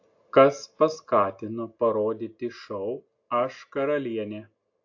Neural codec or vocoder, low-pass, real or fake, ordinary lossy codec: none; 7.2 kHz; real; AAC, 48 kbps